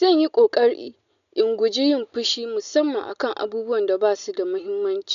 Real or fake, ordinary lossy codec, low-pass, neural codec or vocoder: real; none; 7.2 kHz; none